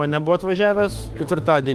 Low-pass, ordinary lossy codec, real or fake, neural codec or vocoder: 14.4 kHz; Opus, 32 kbps; fake; autoencoder, 48 kHz, 32 numbers a frame, DAC-VAE, trained on Japanese speech